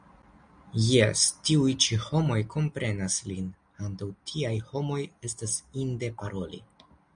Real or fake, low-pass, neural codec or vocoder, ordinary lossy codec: real; 9.9 kHz; none; MP3, 96 kbps